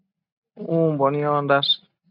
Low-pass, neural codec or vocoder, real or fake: 5.4 kHz; none; real